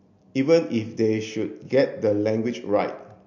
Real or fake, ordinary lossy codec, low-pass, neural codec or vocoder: real; MP3, 48 kbps; 7.2 kHz; none